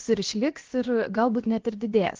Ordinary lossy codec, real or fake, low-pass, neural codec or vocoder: Opus, 16 kbps; fake; 7.2 kHz; codec, 16 kHz, 0.7 kbps, FocalCodec